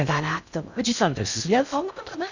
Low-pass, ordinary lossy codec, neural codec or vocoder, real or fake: 7.2 kHz; none; codec, 16 kHz in and 24 kHz out, 0.6 kbps, FocalCodec, streaming, 2048 codes; fake